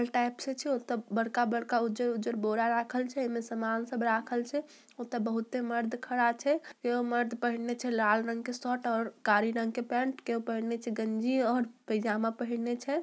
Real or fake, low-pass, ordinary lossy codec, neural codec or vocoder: real; none; none; none